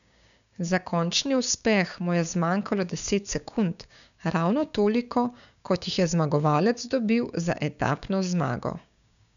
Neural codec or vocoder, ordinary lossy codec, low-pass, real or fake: codec, 16 kHz, 6 kbps, DAC; none; 7.2 kHz; fake